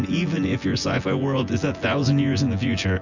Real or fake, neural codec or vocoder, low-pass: fake; vocoder, 24 kHz, 100 mel bands, Vocos; 7.2 kHz